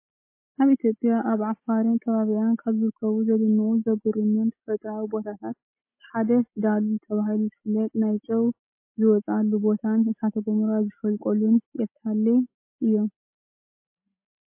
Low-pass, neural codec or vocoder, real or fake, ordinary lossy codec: 3.6 kHz; none; real; MP3, 24 kbps